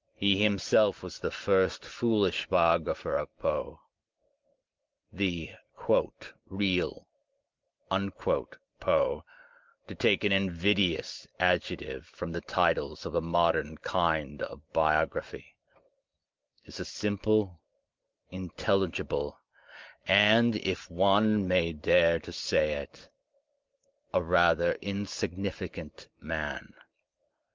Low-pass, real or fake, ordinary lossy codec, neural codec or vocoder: 7.2 kHz; real; Opus, 32 kbps; none